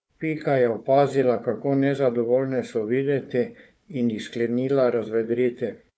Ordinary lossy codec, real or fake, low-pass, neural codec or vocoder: none; fake; none; codec, 16 kHz, 4 kbps, FunCodec, trained on Chinese and English, 50 frames a second